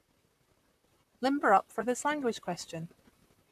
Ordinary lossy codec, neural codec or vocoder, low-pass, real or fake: none; vocoder, 44.1 kHz, 128 mel bands, Pupu-Vocoder; 14.4 kHz; fake